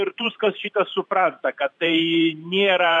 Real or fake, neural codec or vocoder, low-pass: fake; vocoder, 44.1 kHz, 128 mel bands every 512 samples, BigVGAN v2; 10.8 kHz